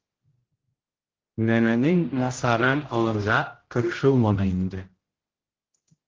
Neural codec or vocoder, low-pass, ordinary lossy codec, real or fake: codec, 16 kHz, 0.5 kbps, X-Codec, HuBERT features, trained on general audio; 7.2 kHz; Opus, 16 kbps; fake